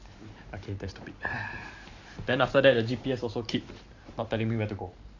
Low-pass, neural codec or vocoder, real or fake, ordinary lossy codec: 7.2 kHz; vocoder, 44.1 kHz, 128 mel bands every 512 samples, BigVGAN v2; fake; AAC, 48 kbps